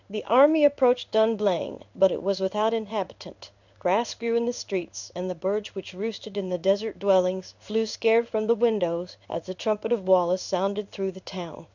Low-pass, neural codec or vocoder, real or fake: 7.2 kHz; codec, 16 kHz in and 24 kHz out, 1 kbps, XY-Tokenizer; fake